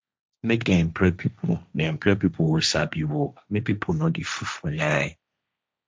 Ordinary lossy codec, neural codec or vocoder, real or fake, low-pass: none; codec, 16 kHz, 1.1 kbps, Voila-Tokenizer; fake; none